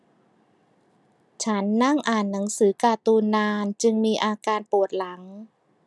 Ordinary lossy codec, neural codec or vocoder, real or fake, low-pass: none; none; real; none